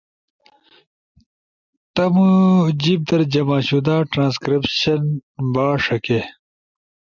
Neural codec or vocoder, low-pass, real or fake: none; 7.2 kHz; real